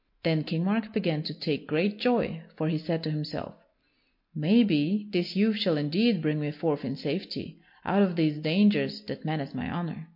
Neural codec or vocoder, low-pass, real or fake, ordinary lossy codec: none; 5.4 kHz; real; MP3, 32 kbps